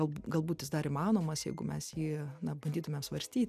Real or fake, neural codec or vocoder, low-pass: real; none; 14.4 kHz